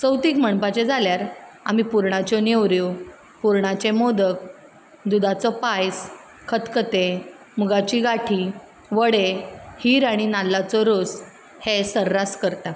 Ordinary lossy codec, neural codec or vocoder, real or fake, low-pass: none; none; real; none